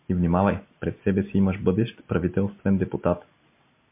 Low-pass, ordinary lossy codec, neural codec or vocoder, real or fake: 3.6 kHz; MP3, 24 kbps; none; real